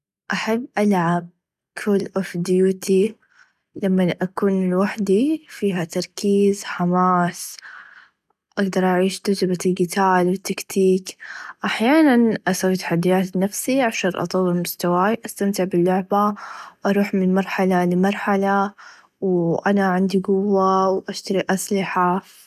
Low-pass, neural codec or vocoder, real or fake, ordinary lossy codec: 14.4 kHz; none; real; none